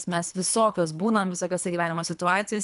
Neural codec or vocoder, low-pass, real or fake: codec, 24 kHz, 3 kbps, HILCodec; 10.8 kHz; fake